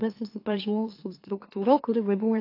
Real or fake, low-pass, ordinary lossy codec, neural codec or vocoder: fake; 5.4 kHz; AAC, 32 kbps; autoencoder, 44.1 kHz, a latent of 192 numbers a frame, MeloTTS